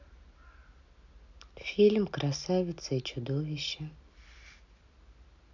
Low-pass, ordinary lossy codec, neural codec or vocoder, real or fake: 7.2 kHz; none; none; real